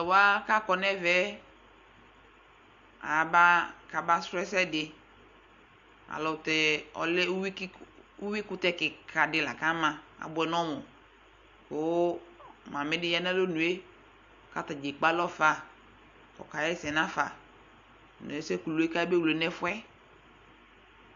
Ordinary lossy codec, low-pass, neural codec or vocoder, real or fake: AAC, 64 kbps; 7.2 kHz; none; real